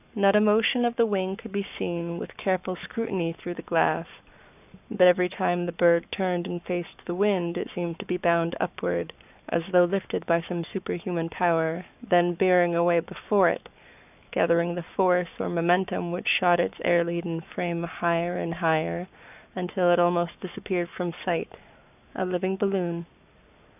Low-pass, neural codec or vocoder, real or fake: 3.6 kHz; codec, 44.1 kHz, 7.8 kbps, Pupu-Codec; fake